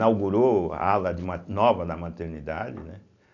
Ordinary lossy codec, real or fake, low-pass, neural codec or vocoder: none; real; 7.2 kHz; none